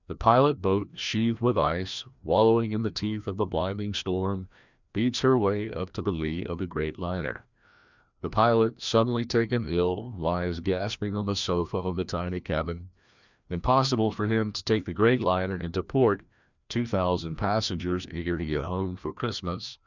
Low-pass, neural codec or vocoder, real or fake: 7.2 kHz; codec, 16 kHz, 1 kbps, FreqCodec, larger model; fake